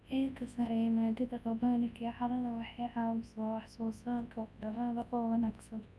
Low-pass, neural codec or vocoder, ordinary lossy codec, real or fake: none; codec, 24 kHz, 0.9 kbps, WavTokenizer, large speech release; none; fake